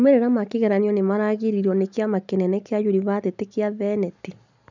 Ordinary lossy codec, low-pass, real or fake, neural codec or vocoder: none; 7.2 kHz; real; none